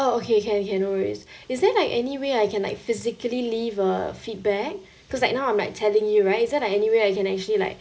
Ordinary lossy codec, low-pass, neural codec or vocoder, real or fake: none; none; none; real